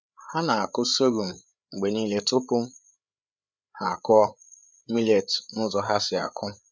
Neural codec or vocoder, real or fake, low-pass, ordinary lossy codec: codec, 16 kHz, 8 kbps, FreqCodec, larger model; fake; none; none